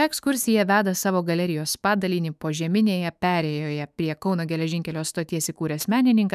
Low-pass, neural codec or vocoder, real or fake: 14.4 kHz; autoencoder, 48 kHz, 128 numbers a frame, DAC-VAE, trained on Japanese speech; fake